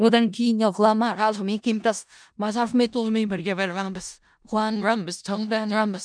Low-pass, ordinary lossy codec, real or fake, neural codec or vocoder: 9.9 kHz; MP3, 96 kbps; fake; codec, 16 kHz in and 24 kHz out, 0.4 kbps, LongCat-Audio-Codec, four codebook decoder